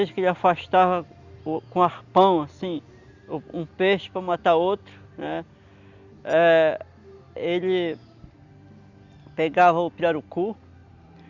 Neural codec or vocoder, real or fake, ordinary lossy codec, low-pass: none; real; Opus, 64 kbps; 7.2 kHz